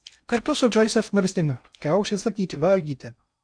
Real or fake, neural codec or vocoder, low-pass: fake; codec, 16 kHz in and 24 kHz out, 0.6 kbps, FocalCodec, streaming, 4096 codes; 9.9 kHz